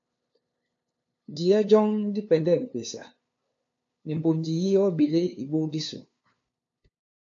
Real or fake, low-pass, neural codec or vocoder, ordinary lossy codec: fake; 7.2 kHz; codec, 16 kHz, 2 kbps, FunCodec, trained on LibriTTS, 25 frames a second; AAC, 48 kbps